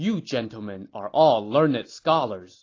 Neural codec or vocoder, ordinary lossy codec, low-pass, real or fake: none; AAC, 32 kbps; 7.2 kHz; real